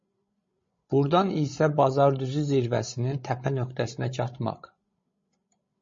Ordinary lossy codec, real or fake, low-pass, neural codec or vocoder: MP3, 32 kbps; fake; 7.2 kHz; codec, 16 kHz, 16 kbps, FreqCodec, larger model